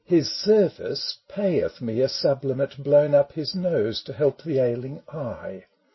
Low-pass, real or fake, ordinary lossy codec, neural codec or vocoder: 7.2 kHz; real; MP3, 24 kbps; none